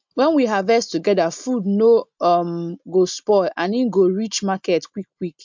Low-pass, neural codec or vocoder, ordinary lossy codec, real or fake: 7.2 kHz; none; MP3, 64 kbps; real